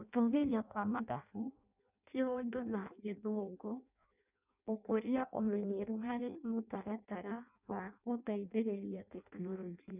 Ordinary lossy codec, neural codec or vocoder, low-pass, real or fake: none; codec, 16 kHz in and 24 kHz out, 0.6 kbps, FireRedTTS-2 codec; 3.6 kHz; fake